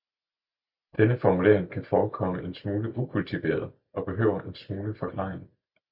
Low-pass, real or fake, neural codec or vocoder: 5.4 kHz; real; none